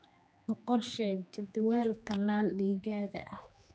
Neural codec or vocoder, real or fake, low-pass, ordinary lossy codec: codec, 16 kHz, 2 kbps, X-Codec, HuBERT features, trained on general audio; fake; none; none